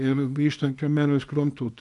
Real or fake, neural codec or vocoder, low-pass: fake; codec, 24 kHz, 0.9 kbps, WavTokenizer, small release; 10.8 kHz